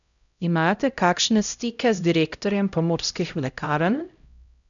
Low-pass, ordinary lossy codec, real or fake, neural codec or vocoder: 7.2 kHz; none; fake; codec, 16 kHz, 0.5 kbps, X-Codec, HuBERT features, trained on LibriSpeech